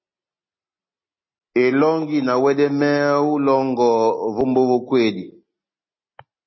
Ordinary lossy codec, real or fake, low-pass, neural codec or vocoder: MP3, 24 kbps; real; 7.2 kHz; none